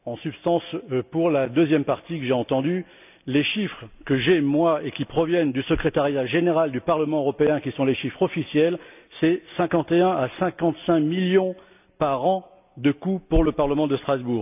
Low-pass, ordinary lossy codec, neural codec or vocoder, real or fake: 3.6 kHz; none; none; real